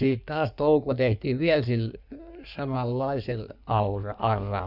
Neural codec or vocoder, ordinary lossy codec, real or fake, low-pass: codec, 16 kHz in and 24 kHz out, 1.1 kbps, FireRedTTS-2 codec; none; fake; 5.4 kHz